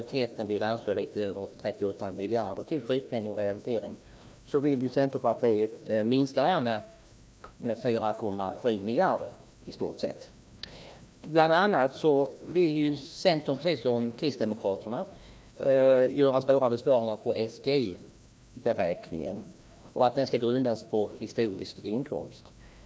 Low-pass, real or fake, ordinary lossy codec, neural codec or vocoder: none; fake; none; codec, 16 kHz, 1 kbps, FreqCodec, larger model